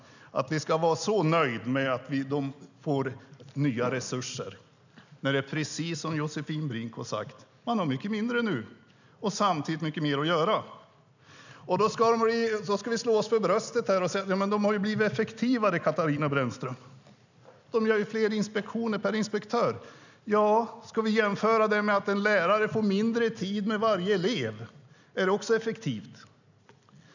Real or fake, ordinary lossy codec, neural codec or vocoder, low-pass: real; none; none; 7.2 kHz